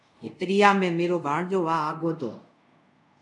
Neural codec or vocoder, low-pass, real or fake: codec, 24 kHz, 0.5 kbps, DualCodec; 10.8 kHz; fake